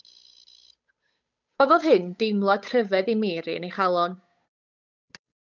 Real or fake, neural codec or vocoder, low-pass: fake; codec, 16 kHz, 8 kbps, FunCodec, trained on Chinese and English, 25 frames a second; 7.2 kHz